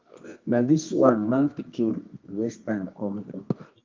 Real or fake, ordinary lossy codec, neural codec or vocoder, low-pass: fake; Opus, 24 kbps; codec, 24 kHz, 0.9 kbps, WavTokenizer, medium music audio release; 7.2 kHz